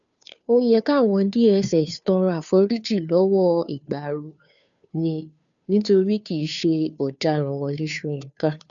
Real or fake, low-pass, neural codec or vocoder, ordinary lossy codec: fake; 7.2 kHz; codec, 16 kHz, 2 kbps, FunCodec, trained on Chinese and English, 25 frames a second; MP3, 96 kbps